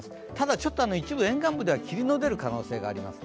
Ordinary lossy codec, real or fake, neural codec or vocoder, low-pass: none; real; none; none